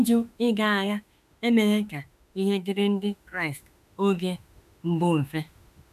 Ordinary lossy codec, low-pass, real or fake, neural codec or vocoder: none; 14.4 kHz; fake; autoencoder, 48 kHz, 32 numbers a frame, DAC-VAE, trained on Japanese speech